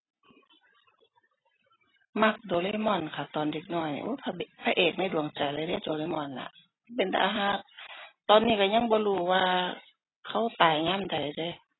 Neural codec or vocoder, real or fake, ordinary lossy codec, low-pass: none; real; AAC, 16 kbps; 7.2 kHz